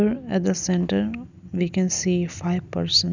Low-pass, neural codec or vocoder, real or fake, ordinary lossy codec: 7.2 kHz; none; real; none